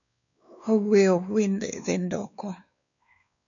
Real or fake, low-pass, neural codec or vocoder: fake; 7.2 kHz; codec, 16 kHz, 2 kbps, X-Codec, WavLM features, trained on Multilingual LibriSpeech